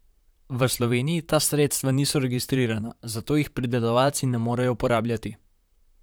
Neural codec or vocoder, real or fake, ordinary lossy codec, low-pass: vocoder, 44.1 kHz, 128 mel bands, Pupu-Vocoder; fake; none; none